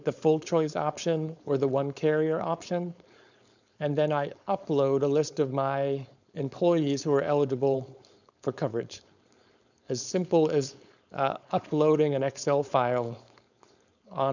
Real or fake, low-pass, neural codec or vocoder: fake; 7.2 kHz; codec, 16 kHz, 4.8 kbps, FACodec